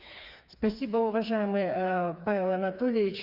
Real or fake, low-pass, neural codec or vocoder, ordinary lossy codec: fake; 5.4 kHz; codec, 16 kHz, 4 kbps, FreqCodec, smaller model; Opus, 64 kbps